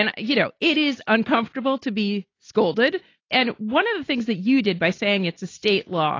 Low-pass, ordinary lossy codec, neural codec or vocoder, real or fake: 7.2 kHz; AAC, 32 kbps; none; real